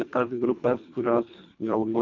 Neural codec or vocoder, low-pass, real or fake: codec, 24 kHz, 1.5 kbps, HILCodec; 7.2 kHz; fake